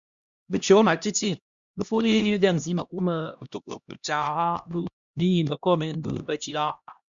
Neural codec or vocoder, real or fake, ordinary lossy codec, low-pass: codec, 16 kHz, 1 kbps, X-Codec, HuBERT features, trained on LibriSpeech; fake; Opus, 64 kbps; 7.2 kHz